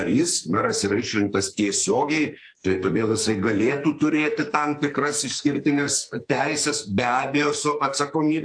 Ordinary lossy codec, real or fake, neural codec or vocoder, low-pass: AAC, 64 kbps; fake; codec, 44.1 kHz, 2.6 kbps, SNAC; 9.9 kHz